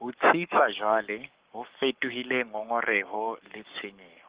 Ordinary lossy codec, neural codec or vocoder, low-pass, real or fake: Opus, 16 kbps; codec, 44.1 kHz, 7.8 kbps, Pupu-Codec; 3.6 kHz; fake